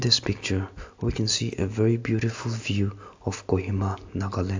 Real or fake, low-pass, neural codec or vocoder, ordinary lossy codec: real; 7.2 kHz; none; AAC, 48 kbps